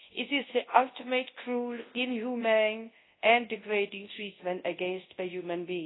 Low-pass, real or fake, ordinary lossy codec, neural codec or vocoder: 7.2 kHz; fake; AAC, 16 kbps; codec, 24 kHz, 0.9 kbps, WavTokenizer, large speech release